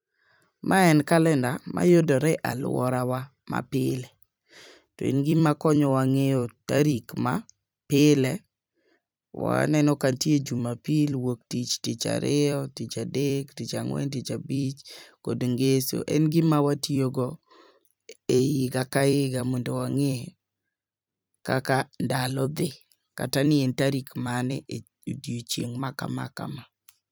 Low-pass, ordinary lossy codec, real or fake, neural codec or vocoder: none; none; fake; vocoder, 44.1 kHz, 128 mel bands every 256 samples, BigVGAN v2